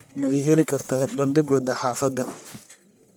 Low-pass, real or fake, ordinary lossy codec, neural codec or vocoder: none; fake; none; codec, 44.1 kHz, 1.7 kbps, Pupu-Codec